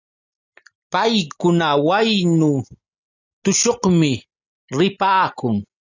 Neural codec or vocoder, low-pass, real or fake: none; 7.2 kHz; real